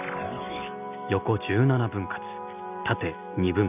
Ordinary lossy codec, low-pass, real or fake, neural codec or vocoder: none; 3.6 kHz; real; none